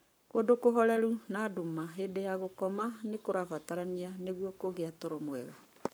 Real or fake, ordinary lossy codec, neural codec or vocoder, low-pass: fake; none; codec, 44.1 kHz, 7.8 kbps, Pupu-Codec; none